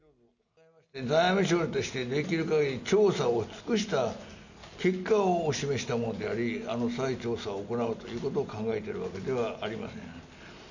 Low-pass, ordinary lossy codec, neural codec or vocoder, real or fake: 7.2 kHz; none; none; real